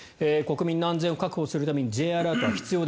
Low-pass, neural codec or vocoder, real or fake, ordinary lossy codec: none; none; real; none